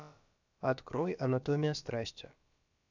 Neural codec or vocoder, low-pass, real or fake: codec, 16 kHz, about 1 kbps, DyCAST, with the encoder's durations; 7.2 kHz; fake